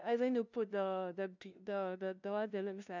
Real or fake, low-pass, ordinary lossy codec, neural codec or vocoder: fake; 7.2 kHz; none; codec, 16 kHz, 0.5 kbps, FunCodec, trained on LibriTTS, 25 frames a second